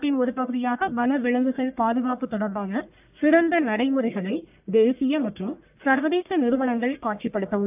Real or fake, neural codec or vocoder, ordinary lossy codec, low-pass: fake; codec, 44.1 kHz, 1.7 kbps, Pupu-Codec; AAC, 32 kbps; 3.6 kHz